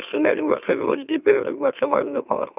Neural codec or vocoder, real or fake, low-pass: autoencoder, 44.1 kHz, a latent of 192 numbers a frame, MeloTTS; fake; 3.6 kHz